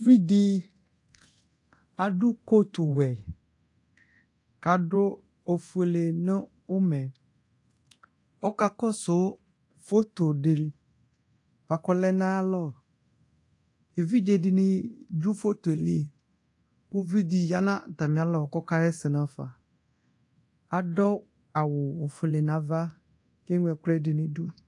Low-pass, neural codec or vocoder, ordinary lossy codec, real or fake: 10.8 kHz; codec, 24 kHz, 0.9 kbps, DualCodec; AAC, 48 kbps; fake